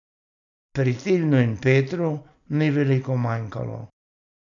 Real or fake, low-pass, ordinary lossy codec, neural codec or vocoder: real; 7.2 kHz; none; none